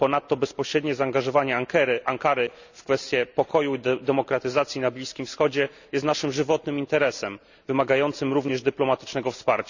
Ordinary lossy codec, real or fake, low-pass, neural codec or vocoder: none; real; 7.2 kHz; none